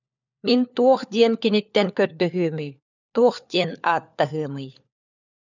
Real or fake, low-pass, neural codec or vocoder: fake; 7.2 kHz; codec, 16 kHz, 4 kbps, FunCodec, trained on LibriTTS, 50 frames a second